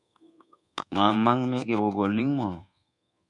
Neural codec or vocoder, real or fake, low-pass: codec, 24 kHz, 1.2 kbps, DualCodec; fake; 10.8 kHz